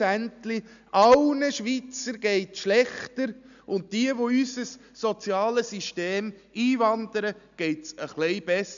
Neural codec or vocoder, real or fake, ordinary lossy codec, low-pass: none; real; none; 7.2 kHz